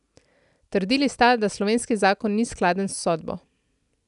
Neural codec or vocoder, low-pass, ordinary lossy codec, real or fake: none; 10.8 kHz; none; real